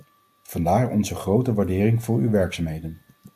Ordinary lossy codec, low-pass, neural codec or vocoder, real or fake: AAC, 96 kbps; 14.4 kHz; none; real